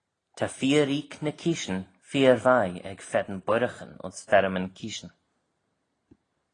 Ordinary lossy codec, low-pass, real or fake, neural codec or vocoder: AAC, 32 kbps; 9.9 kHz; real; none